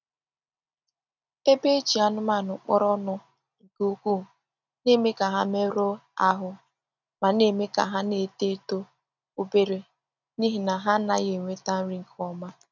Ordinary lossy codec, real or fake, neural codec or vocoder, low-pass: none; real; none; 7.2 kHz